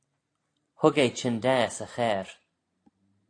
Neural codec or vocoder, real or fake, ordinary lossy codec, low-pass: none; real; AAC, 48 kbps; 9.9 kHz